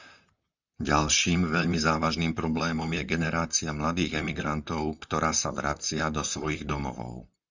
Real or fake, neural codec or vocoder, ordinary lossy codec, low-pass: fake; vocoder, 44.1 kHz, 128 mel bands, Pupu-Vocoder; Opus, 64 kbps; 7.2 kHz